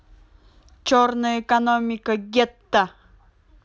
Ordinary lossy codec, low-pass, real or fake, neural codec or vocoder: none; none; real; none